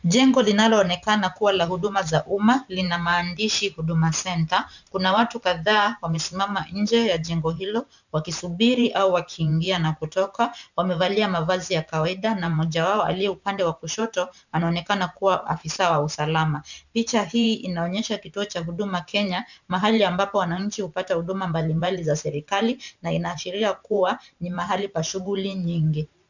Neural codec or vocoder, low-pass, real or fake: vocoder, 44.1 kHz, 128 mel bands every 512 samples, BigVGAN v2; 7.2 kHz; fake